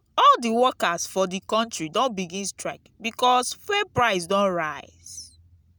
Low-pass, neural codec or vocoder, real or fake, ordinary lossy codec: none; none; real; none